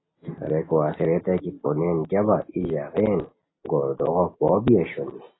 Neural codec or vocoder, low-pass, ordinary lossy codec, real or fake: none; 7.2 kHz; AAC, 16 kbps; real